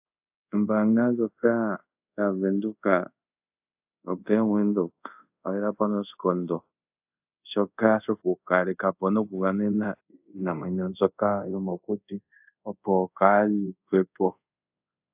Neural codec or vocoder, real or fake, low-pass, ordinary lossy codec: codec, 24 kHz, 0.5 kbps, DualCodec; fake; 3.6 kHz; AAC, 32 kbps